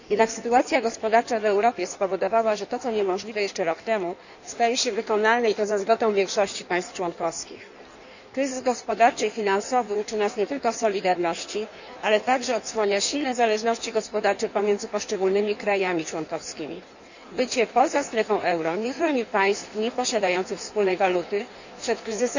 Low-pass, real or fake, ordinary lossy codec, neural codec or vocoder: 7.2 kHz; fake; none; codec, 16 kHz in and 24 kHz out, 1.1 kbps, FireRedTTS-2 codec